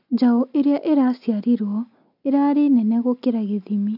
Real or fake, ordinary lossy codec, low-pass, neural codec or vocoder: real; none; 5.4 kHz; none